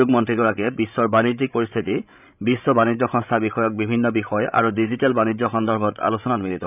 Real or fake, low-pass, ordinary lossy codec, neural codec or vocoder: fake; 3.6 kHz; none; codec, 16 kHz, 16 kbps, FreqCodec, larger model